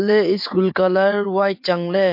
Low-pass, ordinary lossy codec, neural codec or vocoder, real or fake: 5.4 kHz; MP3, 32 kbps; vocoder, 22.05 kHz, 80 mel bands, WaveNeXt; fake